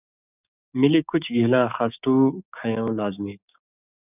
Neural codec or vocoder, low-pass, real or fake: codec, 16 kHz, 6 kbps, DAC; 3.6 kHz; fake